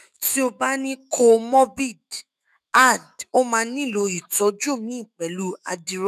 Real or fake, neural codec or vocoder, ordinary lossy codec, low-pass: fake; autoencoder, 48 kHz, 128 numbers a frame, DAC-VAE, trained on Japanese speech; none; 14.4 kHz